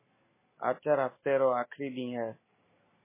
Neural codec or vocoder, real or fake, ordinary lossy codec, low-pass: none; real; MP3, 16 kbps; 3.6 kHz